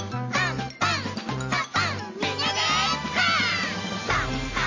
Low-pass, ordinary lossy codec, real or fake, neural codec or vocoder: 7.2 kHz; MP3, 32 kbps; real; none